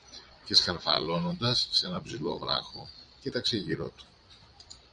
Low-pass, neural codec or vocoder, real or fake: 9.9 kHz; vocoder, 22.05 kHz, 80 mel bands, Vocos; fake